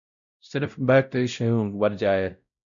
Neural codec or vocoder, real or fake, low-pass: codec, 16 kHz, 0.5 kbps, X-Codec, WavLM features, trained on Multilingual LibriSpeech; fake; 7.2 kHz